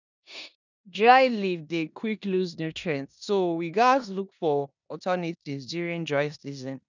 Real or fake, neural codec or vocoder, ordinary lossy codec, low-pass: fake; codec, 16 kHz in and 24 kHz out, 0.9 kbps, LongCat-Audio-Codec, four codebook decoder; none; 7.2 kHz